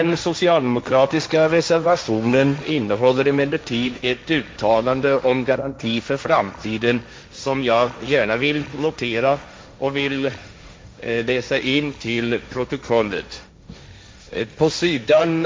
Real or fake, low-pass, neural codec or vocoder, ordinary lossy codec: fake; none; codec, 16 kHz, 1.1 kbps, Voila-Tokenizer; none